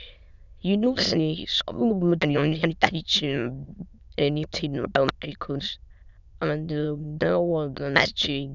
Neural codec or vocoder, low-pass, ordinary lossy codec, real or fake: autoencoder, 22.05 kHz, a latent of 192 numbers a frame, VITS, trained on many speakers; 7.2 kHz; none; fake